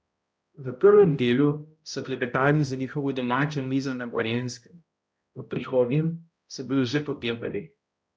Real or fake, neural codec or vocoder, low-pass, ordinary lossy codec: fake; codec, 16 kHz, 0.5 kbps, X-Codec, HuBERT features, trained on balanced general audio; none; none